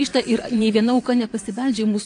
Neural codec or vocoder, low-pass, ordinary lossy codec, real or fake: vocoder, 22.05 kHz, 80 mel bands, Vocos; 9.9 kHz; AAC, 48 kbps; fake